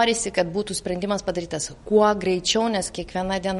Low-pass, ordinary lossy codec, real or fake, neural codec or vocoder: 19.8 kHz; MP3, 48 kbps; real; none